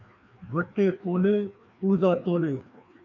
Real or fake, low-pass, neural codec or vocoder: fake; 7.2 kHz; codec, 16 kHz, 2 kbps, FreqCodec, larger model